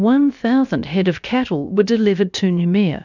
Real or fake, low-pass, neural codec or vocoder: fake; 7.2 kHz; codec, 16 kHz, about 1 kbps, DyCAST, with the encoder's durations